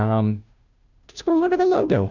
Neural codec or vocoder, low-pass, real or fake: codec, 16 kHz, 0.5 kbps, FunCodec, trained on Chinese and English, 25 frames a second; 7.2 kHz; fake